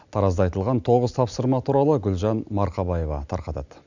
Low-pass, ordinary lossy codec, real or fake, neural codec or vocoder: 7.2 kHz; none; real; none